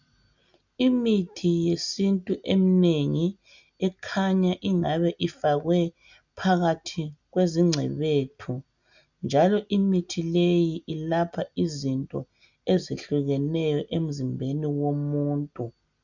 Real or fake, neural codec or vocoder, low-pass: real; none; 7.2 kHz